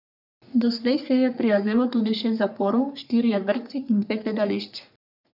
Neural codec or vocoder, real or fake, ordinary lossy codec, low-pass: codec, 44.1 kHz, 3.4 kbps, Pupu-Codec; fake; none; 5.4 kHz